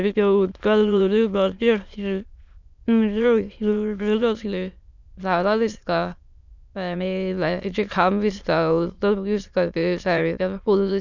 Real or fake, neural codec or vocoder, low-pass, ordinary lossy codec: fake; autoencoder, 22.05 kHz, a latent of 192 numbers a frame, VITS, trained on many speakers; 7.2 kHz; none